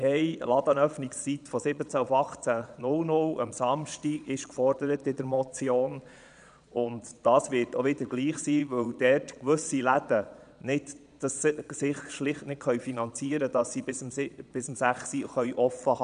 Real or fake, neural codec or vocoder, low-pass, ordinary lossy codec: fake; vocoder, 22.05 kHz, 80 mel bands, Vocos; 9.9 kHz; none